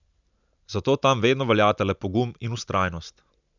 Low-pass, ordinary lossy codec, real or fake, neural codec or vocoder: 7.2 kHz; none; real; none